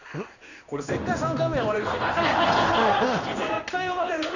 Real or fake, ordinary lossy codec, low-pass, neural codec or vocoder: fake; none; 7.2 kHz; codec, 16 kHz in and 24 kHz out, 1 kbps, XY-Tokenizer